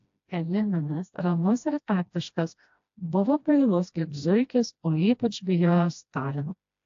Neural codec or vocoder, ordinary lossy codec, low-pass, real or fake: codec, 16 kHz, 1 kbps, FreqCodec, smaller model; AAC, 48 kbps; 7.2 kHz; fake